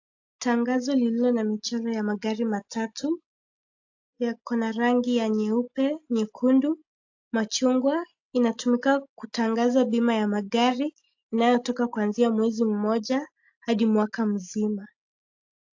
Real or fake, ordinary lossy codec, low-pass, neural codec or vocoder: real; AAC, 48 kbps; 7.2 kHz; none